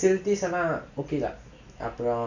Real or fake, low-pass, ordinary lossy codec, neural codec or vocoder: real; 7.2 kHz; none; none